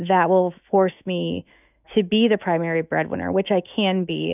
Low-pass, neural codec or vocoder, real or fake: 3.6 kHz; autoencoder, 48 kHz, 128 numbers a frame, DAC-VAE, trained on Japanese speech; fake